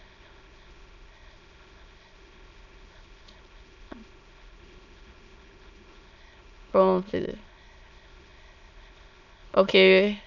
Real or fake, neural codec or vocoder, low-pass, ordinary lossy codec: fake; autoencoder, 22.05 kHz, a latent of 192 numbers a frame, VITS, trained on many speakers; 7.2 kHz; none